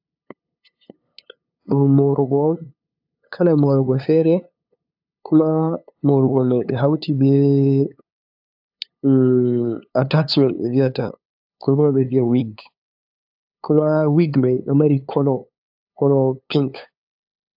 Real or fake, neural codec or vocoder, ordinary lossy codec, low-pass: fake; codec, 16 kHz, 2 kbps, FunCodec, trained on LibriTTS, 25 frames a second; AAC, 48 kbps; 5.4 kHz